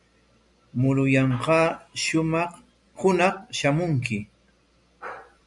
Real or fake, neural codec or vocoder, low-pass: real; none; 10.8 kHz